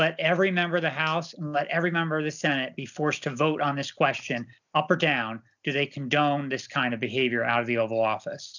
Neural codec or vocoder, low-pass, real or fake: none; 7.2 kHz; real